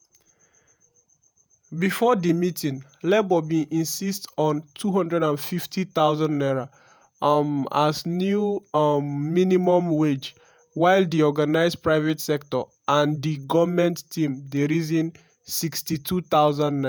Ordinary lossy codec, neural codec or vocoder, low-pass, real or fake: none; vocoder, 48 kHz, 128 mel bands, Vocos; none; fake